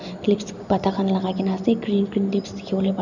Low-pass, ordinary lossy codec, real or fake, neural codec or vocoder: 7.2 kHz; none; real; none